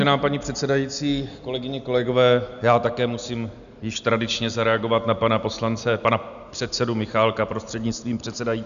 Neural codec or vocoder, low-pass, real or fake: none; 7.2 kHz; real